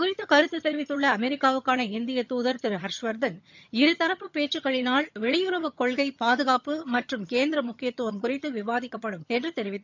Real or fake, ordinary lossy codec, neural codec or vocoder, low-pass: fake; MP3, 64 kbps; vocoder, 22.05 kHz, 80 mel bands, HiFi-GAN; 7.2 kHz